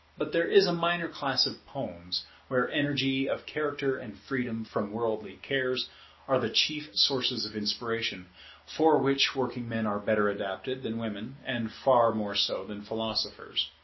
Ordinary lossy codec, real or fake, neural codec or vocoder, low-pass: MP3, 24 kbps; real; none; 7.2 kHz